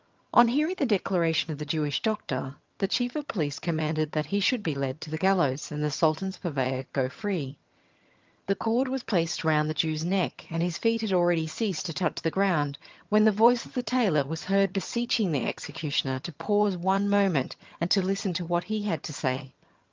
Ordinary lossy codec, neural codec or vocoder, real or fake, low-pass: Opus, 24 kbps; vocoder, 22.05 kHz, 80 mel bands, HiFi-GAN; fake; 7.2 kHz